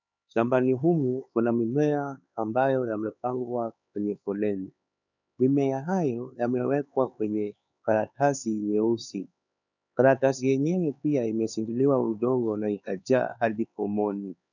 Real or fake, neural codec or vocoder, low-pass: fake; codec, 16 kHz, 4 kbps, X-Codec, HuBERT features, trained on LibriSpeech; 7.2 kHz